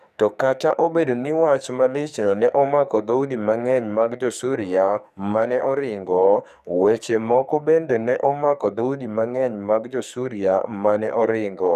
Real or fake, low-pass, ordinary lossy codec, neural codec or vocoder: fake; 14.4 kHz; none; codec, 44.1 kHz, 2.6 kbps, SNAC